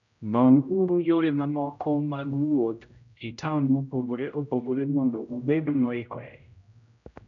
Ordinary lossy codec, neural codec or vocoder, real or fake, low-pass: none; codec, 16 kHz, 0.5 kbps, X-Codec, HuBERT features, trained on general audio; fake; 7.2 kHz